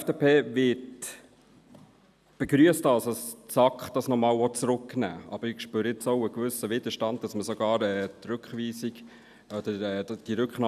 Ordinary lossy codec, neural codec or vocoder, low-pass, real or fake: none; none; 14.4 kHz; real